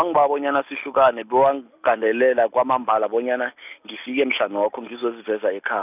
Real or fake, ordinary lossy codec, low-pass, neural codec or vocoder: real; none; 3.6 kHz; none